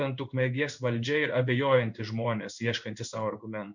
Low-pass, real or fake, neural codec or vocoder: 7.2 kHz; fake; codec, 16 kHz in and 24 kHz out, 1 kbps, XY-Tokenizer